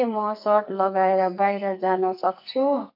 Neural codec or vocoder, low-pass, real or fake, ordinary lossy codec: codec, 44.1 kHz, 2.6 kbps, SNAC; 5.4 kHz; fake; none